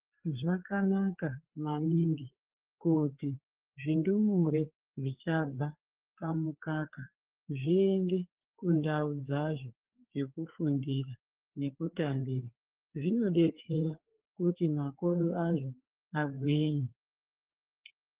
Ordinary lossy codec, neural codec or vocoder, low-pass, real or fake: Opus, 16 kbps; codec, 16 kHz, 4 kbps, FreqCodec, larger model; 3.6 kHz; fake